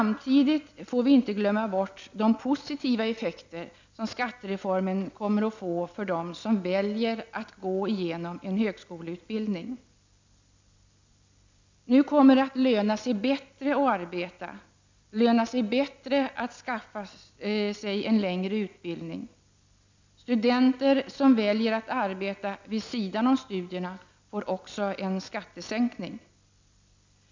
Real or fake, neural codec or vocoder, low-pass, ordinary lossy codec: real; none; 7.2 kHz; MP3, 64 kbps